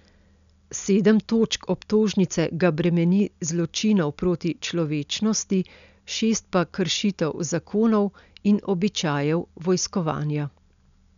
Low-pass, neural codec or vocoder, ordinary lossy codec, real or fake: 7.2 kHz; none; MP3, 96 kbps; real